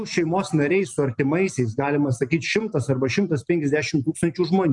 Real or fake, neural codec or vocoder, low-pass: real; none; 10.8 kHz